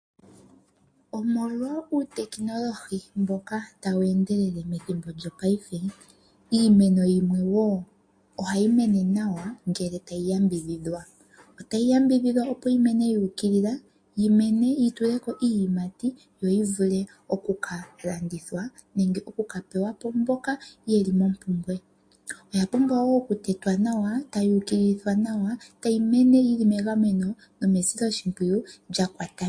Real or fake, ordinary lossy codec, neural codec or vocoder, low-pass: real; MP3, 48 kbps; none; 9.9 kHz